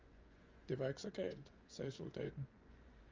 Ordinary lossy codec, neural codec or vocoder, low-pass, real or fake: Opus, 32 kbps; vocoder, 24 kHz, 100 mel bands, Vocos; 7.2 kHz; fake